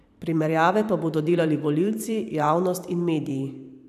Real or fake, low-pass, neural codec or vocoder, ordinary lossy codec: fake; 14.4 kHz; vocoder, 44.1 kHz, 128 mel bands every 512 samples, BigVGAN v2; none